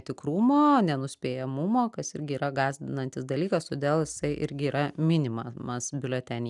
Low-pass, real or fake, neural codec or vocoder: 10.8 kHz; real; none